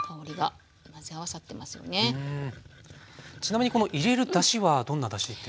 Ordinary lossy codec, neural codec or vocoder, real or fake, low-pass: none; none; real; none